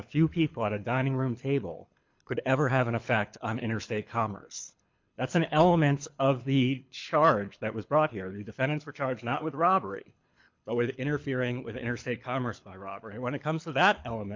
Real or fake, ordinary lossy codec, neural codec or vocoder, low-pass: fake; MP3, 64 kbps; codec, 24 kHz, 6 kbps, HILCodec; 7.2 kHz